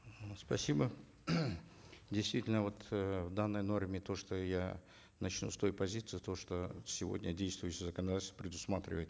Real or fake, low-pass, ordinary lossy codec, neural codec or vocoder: real; none; none; none